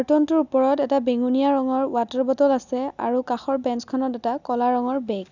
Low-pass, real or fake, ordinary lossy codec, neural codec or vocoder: 7.2 kHz; real; none; none